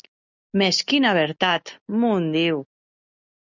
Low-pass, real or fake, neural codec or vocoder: 7.2 kHz; real; none